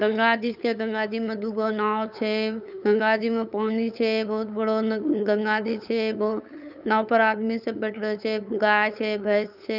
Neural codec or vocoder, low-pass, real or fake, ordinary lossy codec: codec, 16 kHz, 8 kbps, FunCodec, trained on LibriTTS, 25 frames a second; 5.4 kHz; fake; none